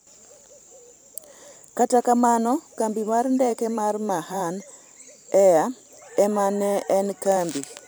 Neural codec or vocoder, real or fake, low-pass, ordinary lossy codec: vocoder, 44.1 kHz, 128 mel bands every 512 samples, BigVGAN v2; fake; none; none